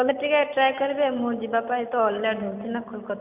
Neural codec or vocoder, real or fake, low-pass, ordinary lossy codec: none; real; 3.6 kHz; none